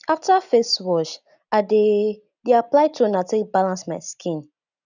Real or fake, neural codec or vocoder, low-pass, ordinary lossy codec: real; none; 7.2 kHz; none